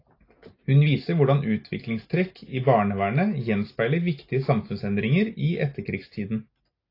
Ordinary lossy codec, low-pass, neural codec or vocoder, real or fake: AAC, 32 kbps; 5.4 kHz; none; real